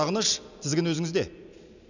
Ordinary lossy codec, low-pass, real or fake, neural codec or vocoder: none; 7.2 kHz; real; none